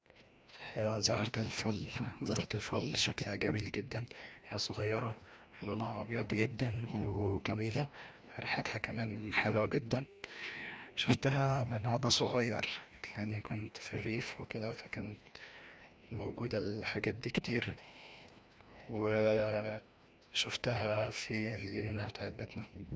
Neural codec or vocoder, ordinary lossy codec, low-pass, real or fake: codec, 16 kHz, 1 kbps, FreqCodec, larger model; none; none; fake